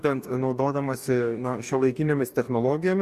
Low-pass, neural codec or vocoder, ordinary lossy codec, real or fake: 14.4 kHz; codec, 44.1 kHz, 2.6 kbps, DAC; Opus, 64 kbps; fake